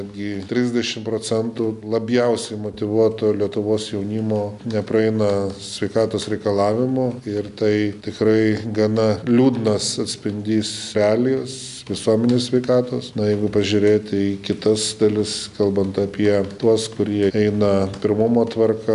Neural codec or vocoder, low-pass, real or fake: none; 10.8 kHz; real